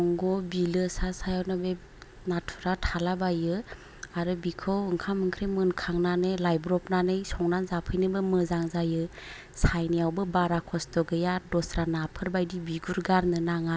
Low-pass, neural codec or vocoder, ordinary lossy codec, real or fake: none; none; none; real